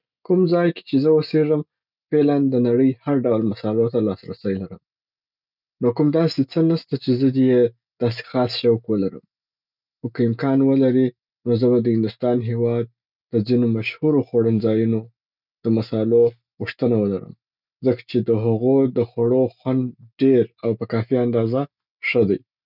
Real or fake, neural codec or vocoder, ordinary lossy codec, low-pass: real; none; none; 5.4 kHz